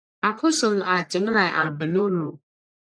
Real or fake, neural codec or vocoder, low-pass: fake; codec, 44.1 kHz, 1.7 kbps, Pupu-Codec; 9.9 kHz